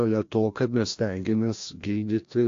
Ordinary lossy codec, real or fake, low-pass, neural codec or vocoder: AAC, 48 kbps; fake; 7.2 kHz; codec, 16 kHz, 1 kbps, FreqCodec, larger model